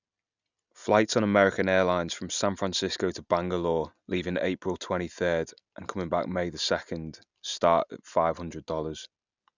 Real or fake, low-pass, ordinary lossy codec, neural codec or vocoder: real; 7.2 kHz; none; none